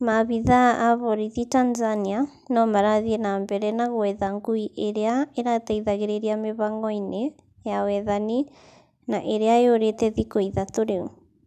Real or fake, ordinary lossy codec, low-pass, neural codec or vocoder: real; none; 14.4 kHz; none